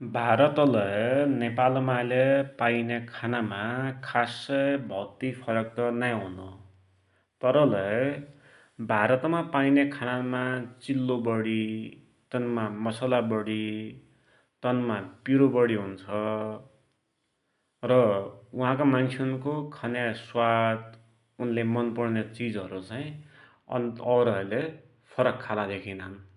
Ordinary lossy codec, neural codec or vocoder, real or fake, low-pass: none; none; real; 10.8 kHz